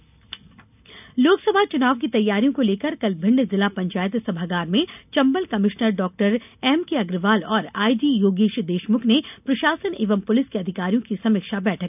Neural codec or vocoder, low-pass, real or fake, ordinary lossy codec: none; 3.6 kHz; real; none